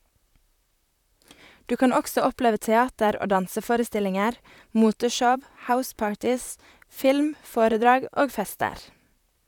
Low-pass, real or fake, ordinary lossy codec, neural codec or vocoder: 19.8 kHz; fake; none; vocoder, 44.1 kHz, 128 mel bands, Pupu-Vocoder